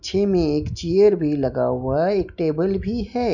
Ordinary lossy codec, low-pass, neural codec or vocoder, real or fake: none; 7.2 kHz; none; real